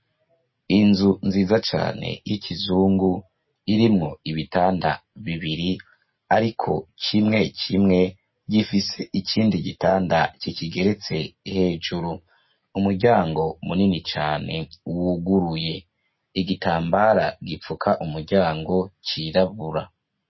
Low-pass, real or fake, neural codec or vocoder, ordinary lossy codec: 7.2 kHz; real; none; MP3, 24 kbps